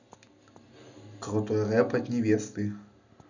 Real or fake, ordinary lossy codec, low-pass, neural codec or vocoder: real; none; 7.2 kHz; none